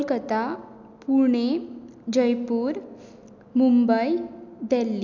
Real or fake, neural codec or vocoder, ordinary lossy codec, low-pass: real; none; none; 7.2 kHz